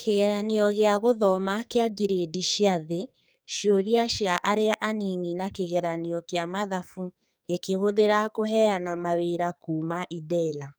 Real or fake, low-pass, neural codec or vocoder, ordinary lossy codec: fake; none; codec, 44.1 kHz, 2.6 kbps, SNAC; none